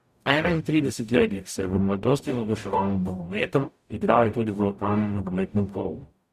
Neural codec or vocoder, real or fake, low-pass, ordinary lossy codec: codec, 44.1 kHz, 0.9 kbps, DAC; fake; 14.4 kHz; Opus, 64 kbps